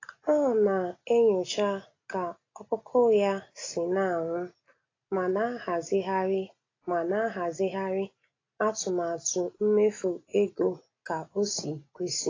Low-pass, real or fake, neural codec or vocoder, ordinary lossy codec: 7.2 kHz; real; none; AAC, 32 kbps